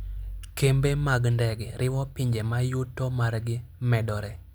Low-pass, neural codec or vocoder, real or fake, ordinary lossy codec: none; none; real; none